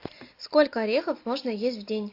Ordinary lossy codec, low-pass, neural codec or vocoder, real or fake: AAC, 32 kbps; 5.4 kHz; none; real